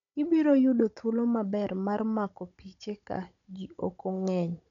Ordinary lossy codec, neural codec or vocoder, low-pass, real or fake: none; codec, 16 kHz, 16 kbps, FunCodec, trained on Chinese and English, 50 frames a second; 7.2 kHz; fake